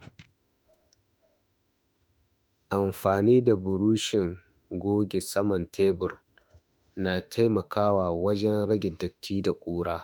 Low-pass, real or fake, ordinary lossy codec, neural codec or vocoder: none; fake; none; autoencoder, 48 kHz, 32 numbers a frame, DAC-VAE, trained on Japanese speech